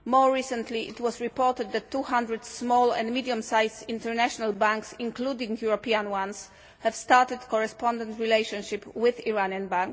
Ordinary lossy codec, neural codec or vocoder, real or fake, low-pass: none; none; real; none